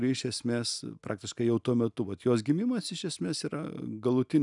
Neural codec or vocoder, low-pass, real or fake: none; 10.8 kHz; real